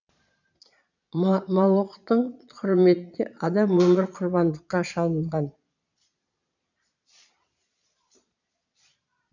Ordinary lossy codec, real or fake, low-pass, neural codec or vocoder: none; fake; 7.2 kHz; vocoder, 22.05 kHz, 80 mel bands, Vocos